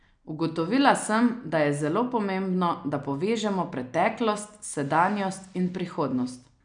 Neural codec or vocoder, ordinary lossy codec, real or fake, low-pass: none; none; real; 9.9 kHz